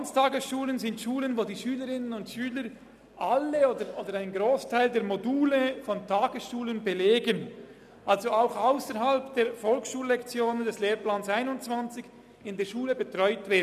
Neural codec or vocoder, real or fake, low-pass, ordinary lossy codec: none; real; 14.4 kHz; none